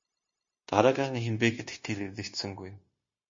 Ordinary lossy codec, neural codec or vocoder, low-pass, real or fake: MP3, 32 kbps; codec, 16 kHz, 0.9 kbps, LongCat-Audio-Codec; 7.2 kHz; fake